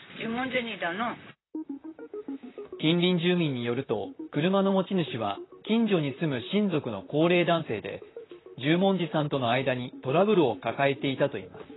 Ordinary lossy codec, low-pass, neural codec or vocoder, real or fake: AAC, 16 kbps; 7.2 kHz; vocoder, 44.1 kHz, 128 mel bands, Pupu-Vocoder; fake